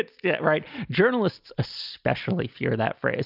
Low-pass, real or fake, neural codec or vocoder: 5.4 kHz; real; none